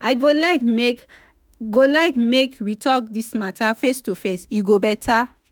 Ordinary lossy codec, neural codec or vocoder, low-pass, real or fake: none; autoencoder, 48 kHz, 32 numbers a frame, DAC-VAE, trained on Japanese speech; none; fake